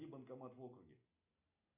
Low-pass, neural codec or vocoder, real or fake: 3.6 kHz; none; real